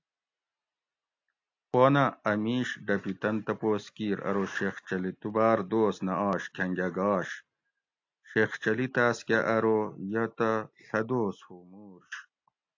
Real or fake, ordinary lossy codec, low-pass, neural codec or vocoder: real; AAC, 48 kbps; 7.2 kHz; none